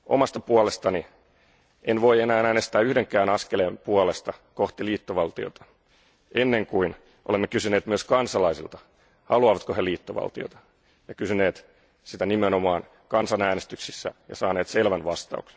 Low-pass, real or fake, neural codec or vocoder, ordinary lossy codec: none; real; none; none